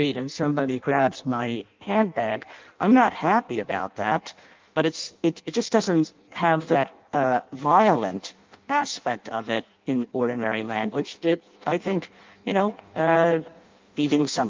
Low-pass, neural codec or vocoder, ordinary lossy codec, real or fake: 7.2 kHz; codec, 16 kHz in and 24 kHz out, 0.6 kbps, FireRedTTS-2 codec; Opus, 24 kbps; fake